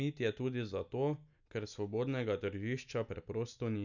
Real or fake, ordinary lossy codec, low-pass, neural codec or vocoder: real; none; 7.2 kHz; none